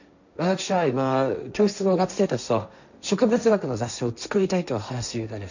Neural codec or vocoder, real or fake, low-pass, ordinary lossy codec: codec, 16 kHz, 1.1 kbps, Voila-Tokenizer; fake; 7.2 kHz; none